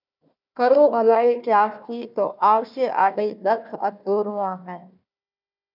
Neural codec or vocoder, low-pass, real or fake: codec, 16 kHz, 1 kbps, FunCodec, trained on Chinese and English, 50 frames a second; 5.4 kHz; fake